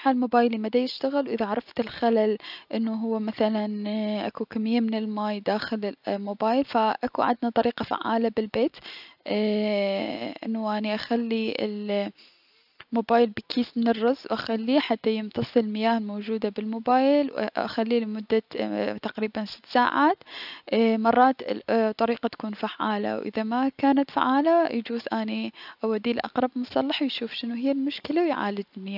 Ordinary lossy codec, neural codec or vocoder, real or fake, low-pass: none; none; real; 5.4 kHz